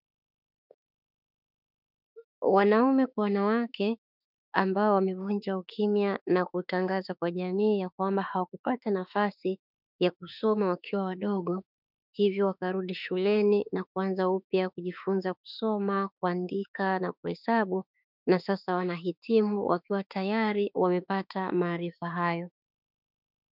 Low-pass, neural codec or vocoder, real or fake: 5.4 kHz; autoencoder, 48 kHz, 32 numbers a frame, DAC-VAE, trained on Japanese speech; fake